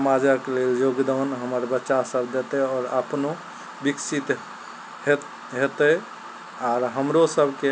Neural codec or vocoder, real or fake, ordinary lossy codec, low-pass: none; real; none; none